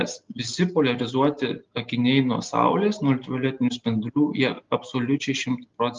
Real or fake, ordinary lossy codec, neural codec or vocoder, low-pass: real; Opus, 32 kbps; none; 7.2 kHz